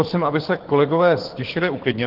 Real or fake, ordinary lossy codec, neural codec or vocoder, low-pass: fake; Opus, 16 kbps; codec, 24 kHz, 6 kbps, HILCodec; 5.4 kHz